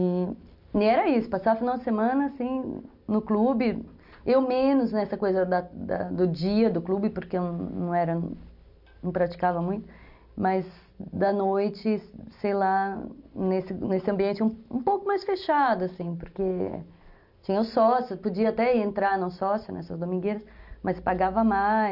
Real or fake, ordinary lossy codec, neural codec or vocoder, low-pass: real; none; none; 5.4 kHz